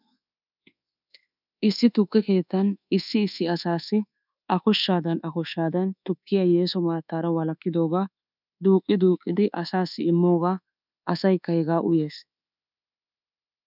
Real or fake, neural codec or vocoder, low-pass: fake; codec, 24 kHz, 1.2 kbps, DualCodec; 5.4 kHz